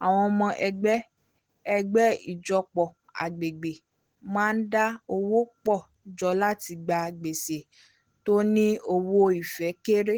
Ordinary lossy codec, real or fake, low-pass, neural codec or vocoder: Opus, 16 kbps; real; 19.8 kHz; none